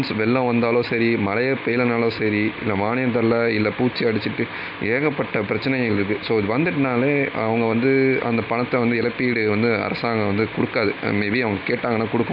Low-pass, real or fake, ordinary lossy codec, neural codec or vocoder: 5.4 kHz; real; AAC, 48 kbps; none